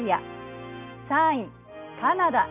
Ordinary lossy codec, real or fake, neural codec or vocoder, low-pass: none; real; none; 3.6 kHz